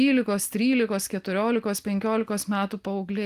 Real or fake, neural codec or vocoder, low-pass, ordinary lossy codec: real; none; 14.4 kHz; Opus, 24 kbps